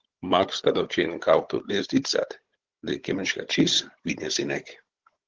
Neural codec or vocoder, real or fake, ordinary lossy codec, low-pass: codec, 16 kHz, 16 kbps, FunCodec, trained on Chinese and English, 50 frames a second; fake; Opus, 16 kbps; 7.2 kHz